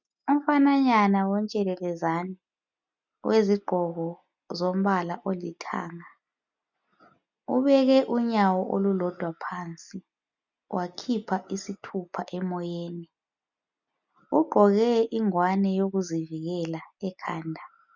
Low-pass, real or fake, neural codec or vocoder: 7.2 kHz; real; none